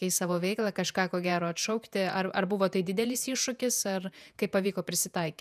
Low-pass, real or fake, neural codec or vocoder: 14.4 kHz; fake; vocoder, 48 kHz, 128 mel bands, Vocos